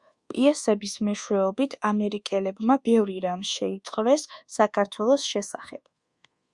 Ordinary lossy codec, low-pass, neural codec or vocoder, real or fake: Opus, 64 kbps; 10.8 kHz; codec, 24 kHz, 1.2 kbps, DualCodec; fake